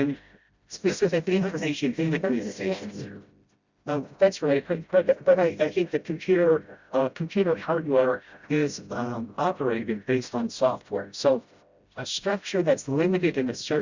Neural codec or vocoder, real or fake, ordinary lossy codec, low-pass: codec, 16 kHz, 0.5 kbps, FreqCodec, smaller model; fake; Opus, 64 kbps; 7.2 kHz